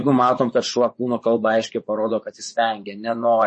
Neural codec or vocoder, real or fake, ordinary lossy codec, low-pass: vocoder, 24 kHz, 100 mel bands, Vocos; fake; MP3, 32 kbps; 10.8 kHz